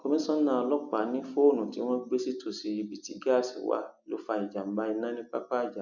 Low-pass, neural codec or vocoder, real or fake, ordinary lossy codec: 7.2 kHz; none; real; none